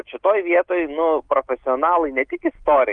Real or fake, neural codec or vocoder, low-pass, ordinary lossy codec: real; none; 9.9 kHz; Opus, 32 kbps